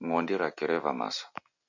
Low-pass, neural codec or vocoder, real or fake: 7.2 kHz; none; real